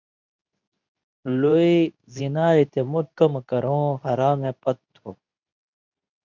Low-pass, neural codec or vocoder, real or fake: 7.2 kHz; codec, 24 kHz, 0.9 kbps, WavTokenizer, medium speech release version 2; fake